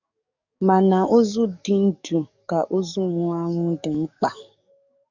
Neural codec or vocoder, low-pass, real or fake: codec, 44.1 kHz, 7.8 kbps, DAC; 7.2 kHz; fake